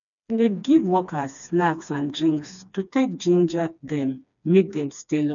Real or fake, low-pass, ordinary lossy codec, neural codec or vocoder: fake; 7.2 kHz; none; codec, 16 kHz, 2 kbps, FreqCodec, smaller model